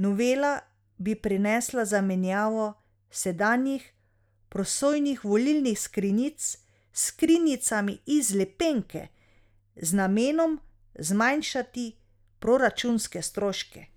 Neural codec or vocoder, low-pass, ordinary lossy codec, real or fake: none; 19.8 kHz; none; real